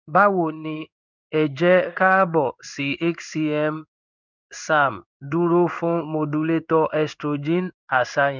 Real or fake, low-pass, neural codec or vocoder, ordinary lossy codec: fake; 7.2 kHz; codec, 16 kHz in and 24 kHz out, 1 kbps, XY-Tokenizer; none